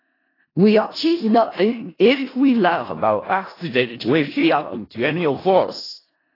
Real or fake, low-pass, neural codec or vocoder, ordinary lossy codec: fake; 5.4 kHz; codec, 16 kHz in and 24 kHz out, 0.4 kbps, LongCat-Audio-Codec, four codebook decoder; AAC, 24 kbps